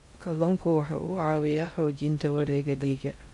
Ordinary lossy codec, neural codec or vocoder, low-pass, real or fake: MP3, 64 kbps; codec, 16 kHz in and 24 kHz out, 0.6 kbps, FocalCodec, streaming, 2048 codes; 10.8 kHz; fake